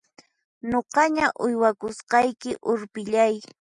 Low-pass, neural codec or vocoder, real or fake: 10.8 kHz; none; real